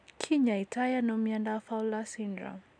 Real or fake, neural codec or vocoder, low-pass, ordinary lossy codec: real; none; 9.9 kHz; none